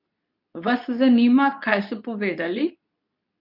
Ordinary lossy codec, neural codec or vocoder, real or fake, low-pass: none; codec, 24 kHz, 0.9 kbps, WavTokenizer, medium speech release version 2; fake; 5.4 kHz